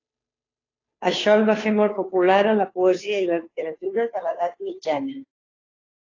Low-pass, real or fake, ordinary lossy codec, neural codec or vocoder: 7.2 kHz; fake; AAC, 32 kbps; codec, 16 kHz, 2 kbps, FunCodec, trained on Chinese and English, 25 frames a second